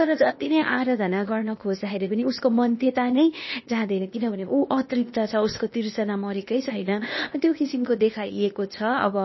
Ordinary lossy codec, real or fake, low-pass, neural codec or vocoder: MP3, 24 kbps; fake; 7.2 kHz; codec, 16 kHz, 0.8 kbps, ZipCodec